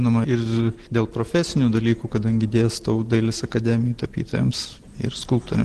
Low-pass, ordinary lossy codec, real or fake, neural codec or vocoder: 10.8 kHz; Opus, 16 kbps; real; none